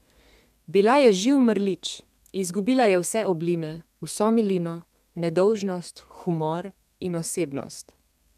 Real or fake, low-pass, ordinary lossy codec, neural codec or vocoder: fake; 14.4 kHz; none; codec, 32 kHz, 1.9 kbps, SNAC